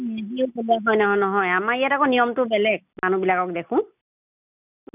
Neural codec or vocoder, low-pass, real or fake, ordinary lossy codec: none; 3.6 kHz; real; none